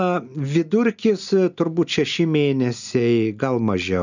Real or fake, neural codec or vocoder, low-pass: real; none; 7.2 kHz